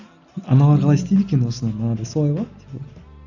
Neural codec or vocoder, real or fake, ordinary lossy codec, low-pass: none; real; none; 7.2 kHz